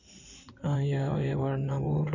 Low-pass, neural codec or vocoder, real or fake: 7.2 kHz; codec, 16 kHz in and 24 kHz out, 2.2 kbps, FireRedTTS-2 codec; fake